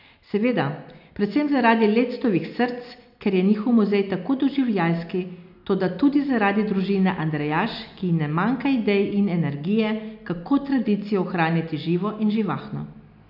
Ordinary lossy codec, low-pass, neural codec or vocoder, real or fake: none; 5.4 kHz; none; real